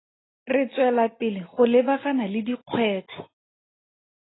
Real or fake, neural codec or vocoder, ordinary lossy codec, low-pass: real; none; AAC, 16 kbps; 7.2 kHz